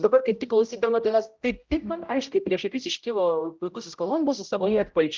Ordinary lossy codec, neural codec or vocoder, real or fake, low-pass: Opus, 24 kbps; codec, 16 kHz, 0.5 kbps, X-Codec, HuBERT features, trained on general audio; fake; 7.2 kHz